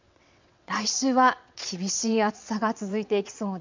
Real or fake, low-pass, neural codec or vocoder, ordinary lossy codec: fake; 7.2 kHz; vocoder, 22.05 kHz, 80 mel bands, WaveNeXt; MP3, 64 kbps